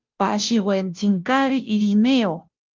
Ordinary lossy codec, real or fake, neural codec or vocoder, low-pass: Opus, 24 kbps; fake; codec, 16 kHz, 0.5 kbps, FunCodec, trained on Chinese and English, 25 frames a second; 7.2 kHz